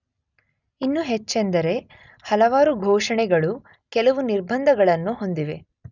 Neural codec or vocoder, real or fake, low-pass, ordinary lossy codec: none; real; 7.2 kHz; none